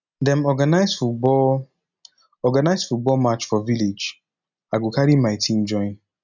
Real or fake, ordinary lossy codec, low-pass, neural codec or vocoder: real; none; 7.2 kHz; none